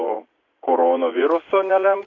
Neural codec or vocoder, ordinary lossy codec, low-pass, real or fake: vocoder, 44.1 kHz, 80 mel bands, Vocos; AAC, 32 kbps; 7.2 kHz; fake